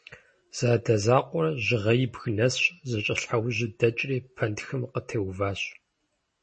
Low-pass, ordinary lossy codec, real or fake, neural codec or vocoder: 10.8 kHz; MP3, 32 kbps; real; none